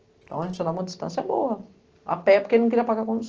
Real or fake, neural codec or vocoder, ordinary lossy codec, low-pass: real; none; Opus, 16 kbps; 7.2 kHz